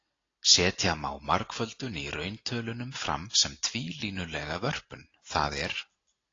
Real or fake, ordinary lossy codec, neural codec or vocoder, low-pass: real; AAC, 32 kbps; none; 7.2 kHz